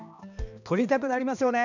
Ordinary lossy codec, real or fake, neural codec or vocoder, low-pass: none; fake; codec, 16 kHz, 2 kbps, X-Codec, HuBERT features, trained on balanced general audio; 7.2 kHz